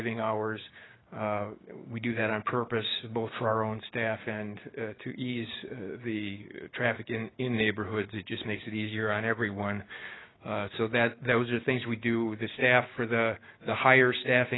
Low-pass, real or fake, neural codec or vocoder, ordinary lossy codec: 7.2 kHz; fake; codec, 16 kHz, 6 kbps, DAC; AAC, 16 kbps